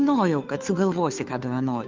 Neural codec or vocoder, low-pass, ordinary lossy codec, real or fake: codec, 16 kHz, 2 kbps, FunCodec, trained on Chinese and English, 25 frames a second; 7.2 kHz; Opus, 24 kbps; fake